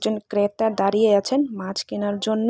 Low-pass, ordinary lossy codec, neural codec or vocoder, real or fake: none; none; none; real